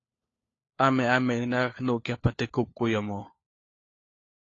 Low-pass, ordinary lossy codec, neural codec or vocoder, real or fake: 7.2 kHz; AAC, 32 kbps; codec, 16 kHz, 4 kbps, FunCodec, trained on LibriTTS, 50 frames a second; fake